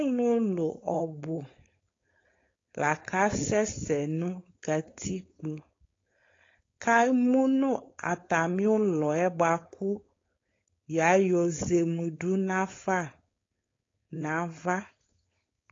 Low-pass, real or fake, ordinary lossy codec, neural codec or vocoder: 7.2 kHz; fake; AAC, 32 kbps; codec, 16 kHz, 4.8 kbps, FACodec